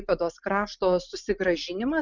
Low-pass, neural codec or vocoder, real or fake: 7.2 kHz; none; real